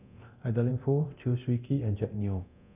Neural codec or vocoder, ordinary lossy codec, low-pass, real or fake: codec, 24 kHz, 0.9 kbps, DualCodec; none; 3.6 kHz; fake